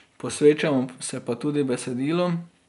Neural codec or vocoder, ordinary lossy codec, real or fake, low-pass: none; none; real; 10.8 kHz